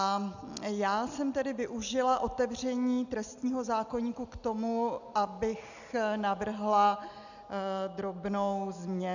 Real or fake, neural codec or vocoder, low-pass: real; none; 7.2 kHz